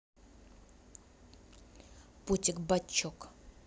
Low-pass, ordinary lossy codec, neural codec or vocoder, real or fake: none; none; none; real